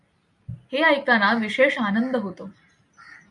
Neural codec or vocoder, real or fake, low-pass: none; real; 10.8 kHz